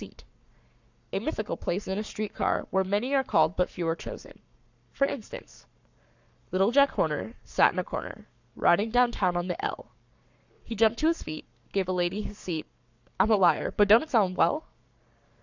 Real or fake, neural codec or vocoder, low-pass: fake; codec, 44.1 kHz, 7.8 kbps, Pupu-Codec; 7.2 kHz